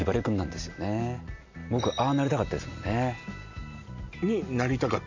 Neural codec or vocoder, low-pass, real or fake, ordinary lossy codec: none; 7.2 kHz; real; MP3, 48 kbps